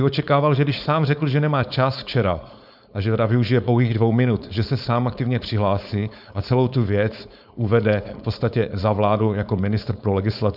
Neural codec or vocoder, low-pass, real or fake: codec, 16 kHz, 4.8 kbps, FACodec; 5.4 kHz; fake